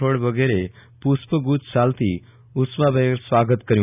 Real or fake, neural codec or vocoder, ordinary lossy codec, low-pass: real; none; none; 3.6 kHz